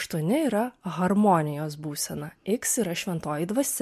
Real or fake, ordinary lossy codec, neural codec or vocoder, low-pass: real; MP3, 64 kbps; none; 14.4 kHz